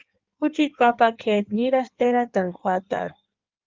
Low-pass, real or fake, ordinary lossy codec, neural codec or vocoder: 7.2 kHz; fake; Opus, 24 kbps; codec, 16 kHz in and 24 kHz out, 1.1 kbps, FireRedTTS-2 codec